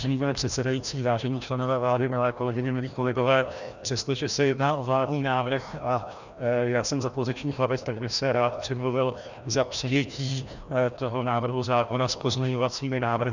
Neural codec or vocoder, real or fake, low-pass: codec, 16 kHz, 1 kbps, FreqCodec, larger model; fake; 7.2 kHz